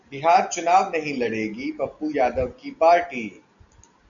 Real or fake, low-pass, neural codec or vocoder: real; 7.2 kHz; none